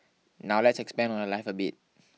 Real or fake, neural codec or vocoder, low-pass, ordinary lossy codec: real; none; none; none